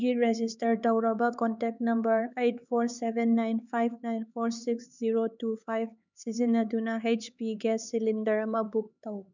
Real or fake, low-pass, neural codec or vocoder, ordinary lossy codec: fake; 7.2 kHz; codec, 16 kHz, 4 kbps, X-Codec, HuBERT features, trained on LibriSpeech; none